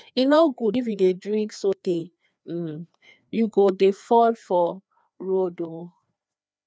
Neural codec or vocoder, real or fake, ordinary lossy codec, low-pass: codec, 16 kHz, 2 kbps, FreqCodec, larger model; fake; none; none